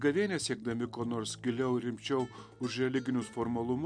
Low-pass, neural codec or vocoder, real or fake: 9.9 kHz; none; real